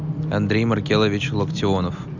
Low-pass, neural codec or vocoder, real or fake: 7.2 kHz; none; real